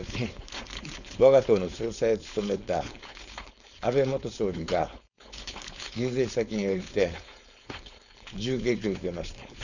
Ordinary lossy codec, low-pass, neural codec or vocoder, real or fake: none; 7.2 kHz; codec, 16 kHz, 4.8 kbps, FACodec; fake